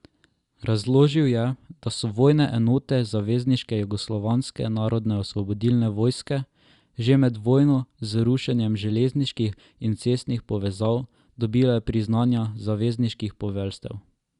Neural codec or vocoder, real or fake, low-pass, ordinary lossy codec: none; real; 10.8 kHz; Opus, 64 kbps